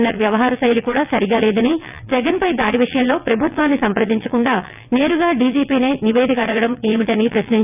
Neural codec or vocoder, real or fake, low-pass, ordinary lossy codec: vocoder, 22.05 kHz, 80 mel bands, WaveNeXt; fake; 3.6 kHz; none